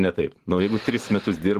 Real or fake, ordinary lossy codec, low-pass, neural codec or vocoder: fake; Opus, 16 kbps; 14.4 kHz; vocoder, 44.1 kHz, 128 mel bands every 512 samples, BigVGAN v2